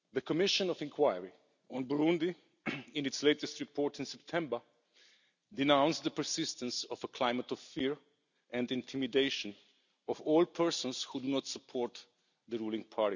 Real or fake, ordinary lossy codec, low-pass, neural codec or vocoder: real; none; 7.2 kHz; none